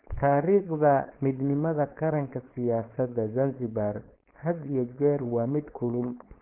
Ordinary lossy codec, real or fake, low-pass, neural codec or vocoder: Opus, 16 kbps; fake; 3.6 kHz; codec, 16 kHz, 4.8 kbps, FACodec